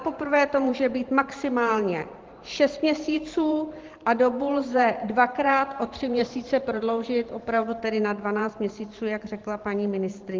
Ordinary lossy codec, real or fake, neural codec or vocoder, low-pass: Opus, 16 kbps; fake; vocoder, 44.1 kHz, 128 mel bands every 512 samples, BigVGAN v2; 7.2 kHz